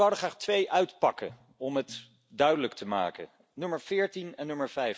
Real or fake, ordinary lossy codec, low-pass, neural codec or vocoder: real; none; none; none